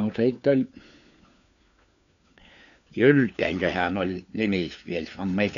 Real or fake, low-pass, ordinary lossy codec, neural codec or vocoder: fake; 7.2 kHz; none; codec, 16 kHz, 4 kbps, FunCodec, trained on LibriTTS, 50 frames a second